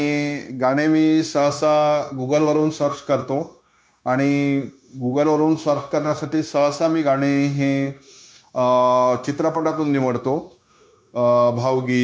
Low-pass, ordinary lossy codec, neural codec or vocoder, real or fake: none; none; codec, 16 kHz, 0.9 kbps, LongCat-Audio-Codec; fake